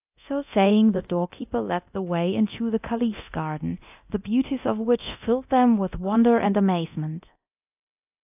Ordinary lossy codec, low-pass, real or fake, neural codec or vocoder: AAC, 32 kbps; 3.6 kHz; fake; codec, 24 kHz, 0.9 kbps, DualCodec